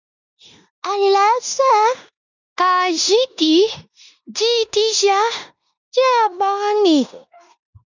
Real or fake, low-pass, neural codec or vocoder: fake; 7.2 kHz; codec, 16 kHz in and 24 kHz out, 0.9 kbps, LongCat-Audio-Codec, four codebook decoder